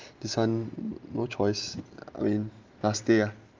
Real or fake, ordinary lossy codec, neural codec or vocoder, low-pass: real; Opus, 32 kbps; none; 7.2 kHz